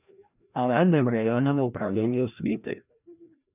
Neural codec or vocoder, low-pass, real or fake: codec, 16 kHz, 1 kbps, FreqCodec, larger model; 3.6 kHz; fake